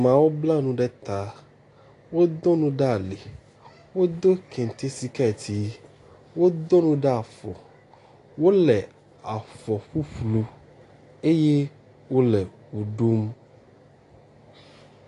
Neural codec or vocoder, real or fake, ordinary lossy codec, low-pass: none; real; AAC, 48 kbps; 10.8 kHz